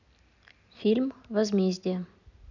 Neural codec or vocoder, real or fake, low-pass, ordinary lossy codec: none; real; 7.2 kHz; none